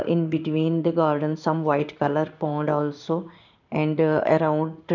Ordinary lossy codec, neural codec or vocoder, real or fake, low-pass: none; codec, 16 kHz in and 24 kHz out, 1 kbps, XY-Tokenizer; fake; 7.2 kHz